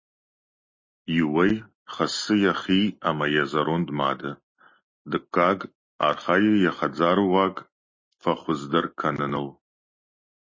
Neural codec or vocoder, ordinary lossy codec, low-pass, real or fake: none; MP3, 32 kbps; 7.2 kHz; real